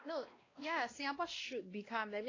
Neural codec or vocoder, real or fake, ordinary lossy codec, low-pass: codec, 16 kHz, 1 kbps, X-Codec, WavLM features, trained on Multilingual LibriSpeech; fake; AAC, 32 kbps; 7.2 kHz